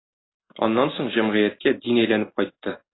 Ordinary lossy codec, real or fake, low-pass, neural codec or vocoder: AAC, 16 kbps; real; 7.2 kHz; none